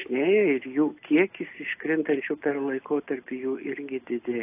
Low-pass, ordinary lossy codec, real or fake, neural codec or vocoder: 3.6 kHz; AAC, 24 kbps; real; none